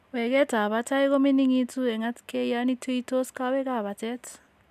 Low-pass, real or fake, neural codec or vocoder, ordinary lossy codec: 14.4 kHz; real; none; none